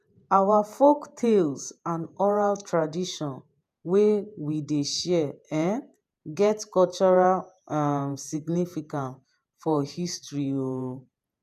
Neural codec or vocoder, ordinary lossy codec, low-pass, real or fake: vocoder, 48 kHz, 128 mel bands, Vocos; none; 14.4 kHz; fake